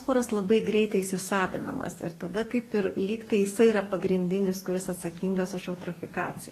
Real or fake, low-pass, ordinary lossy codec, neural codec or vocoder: fake; 14.4 kHz; AAC, 48 kbps; codec, 44.1 kHz, 2.6 kbps, DAC